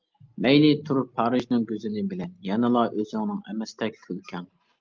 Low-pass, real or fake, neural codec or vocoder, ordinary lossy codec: 7.2 kHz; real; none; Opus, 24 kbps